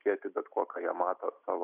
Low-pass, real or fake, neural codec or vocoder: 3.6 kHz; real; none